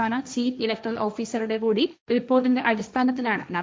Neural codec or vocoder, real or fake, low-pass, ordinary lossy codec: codec, 16 kHz, 1.1 kbps, Voila-Tokenizer; fake; none; none